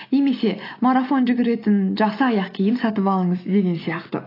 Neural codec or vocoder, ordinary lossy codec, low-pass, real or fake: none; AAC, 24 kbps; 5.4 kHz; real